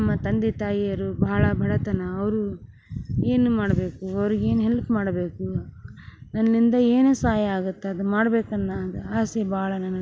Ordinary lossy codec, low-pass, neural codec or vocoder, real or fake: none; none; none; real